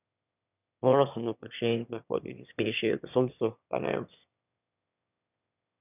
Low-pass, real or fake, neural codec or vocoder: 3.6 kHz; fake; autoencoder, 22.05 kHz, a latent of 192 numbers a frame, VITS, trained on one speaker